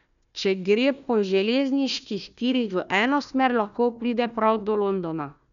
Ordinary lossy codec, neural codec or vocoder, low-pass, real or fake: none; codec, 16 kHz, 1 kbps, FunCodec, trained on Chinese and English, 50 frames a second; 7.2 kHz; fake